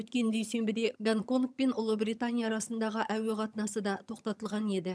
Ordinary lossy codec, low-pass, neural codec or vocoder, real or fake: none; none; vocoder, 22.05 kHz, 80 mel bands, HiFi-GAN; fake